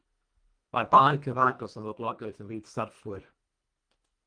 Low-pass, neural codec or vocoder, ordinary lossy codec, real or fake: 9.9 kHz; codec, 24 kHz, 1.5 kbps, HILCodec; Opus, 32 kbps; fake